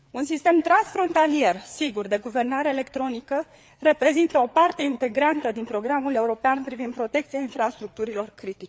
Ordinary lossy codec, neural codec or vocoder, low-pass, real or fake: none; codec, 16 kHz, 4 kbps, FreqCodec, larger model; none; fake